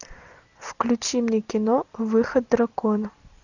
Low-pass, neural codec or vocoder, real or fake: 7.2 kHz; none; real